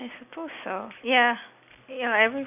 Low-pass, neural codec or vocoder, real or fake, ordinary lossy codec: 3.6 kHz; none; real; none